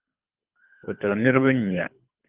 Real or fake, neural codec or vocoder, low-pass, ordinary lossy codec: fake; codec, 24 kHz, 3 kbps, HILCodec; 3.6 kHz; Opus, 32 kbps